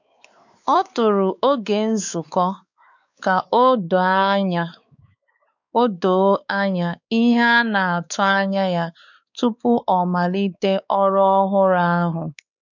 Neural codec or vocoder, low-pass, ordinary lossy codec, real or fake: codec, 16 kHz, 4 kbps, X-Codec, WavLM features, trained on Multilingual LibriSpeech; 7.2 kHz; none; fake